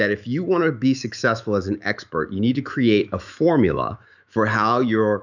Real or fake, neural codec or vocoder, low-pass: fake; autoencoder, 48 kHz, 128 numbers a frame, DAC-VAE, trained on Japanese speech; 7.2 kHz